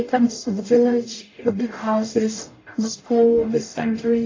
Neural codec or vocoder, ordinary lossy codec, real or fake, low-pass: codec, 44.1 kHz, 0.9 kbps, DAC; AAC, 32 kbps; fake; 7.2 kHz